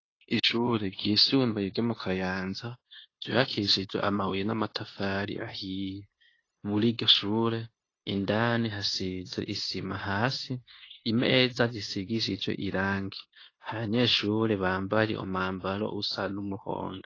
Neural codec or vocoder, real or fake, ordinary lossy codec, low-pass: codec, 16 kHz, 0.9 kbps, LongCat-Audio-Codec; fake; AAC, 32 kbps; 7.2 kHz